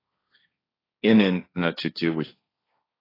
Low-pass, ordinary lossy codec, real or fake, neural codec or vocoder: 5.4 kHz; AAC, 24 kbps; fake; codec, 16 kHz, 1.1 kbps, Voila-Tokenizer